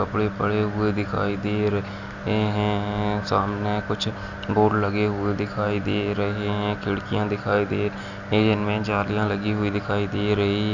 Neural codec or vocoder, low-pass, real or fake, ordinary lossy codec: none; 7.2 kHz; real; none